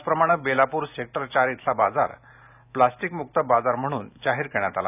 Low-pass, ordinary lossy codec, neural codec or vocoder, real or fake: 3.6 kHz; none; none; real